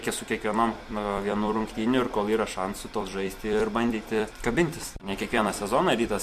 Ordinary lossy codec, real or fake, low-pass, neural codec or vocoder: MP3, 64 kbps; fake; 14.4 kHz; vocoder, 44.1 kHz, 128 mel bands every 256 samples, BigVGAN v2